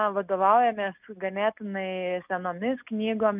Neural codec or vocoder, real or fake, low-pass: none; real; 3.6 kHz